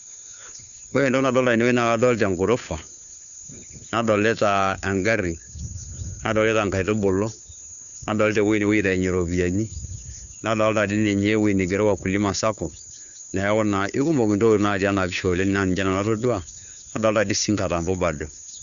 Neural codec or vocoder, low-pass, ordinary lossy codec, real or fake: codec, 16 kHz, 2 kbps, FunCodec, trained on Chinese and English, 25 frames a second; 7.2 kHz; none; fake